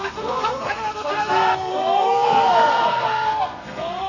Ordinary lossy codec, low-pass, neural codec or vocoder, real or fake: AAC, 48 kbps; 7.2 kHz; codec, 32 kHz, 1.9 kbps, SNAC; fake